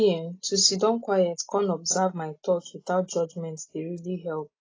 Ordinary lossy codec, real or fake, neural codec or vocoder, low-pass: AAC, 32 kbps; real; none; 7.2 kHz